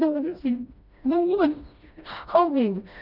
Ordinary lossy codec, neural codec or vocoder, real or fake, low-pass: none; codec, 16 kHz, 1 kbps, FreqCodec, smaller model; fake; 5.4 kHz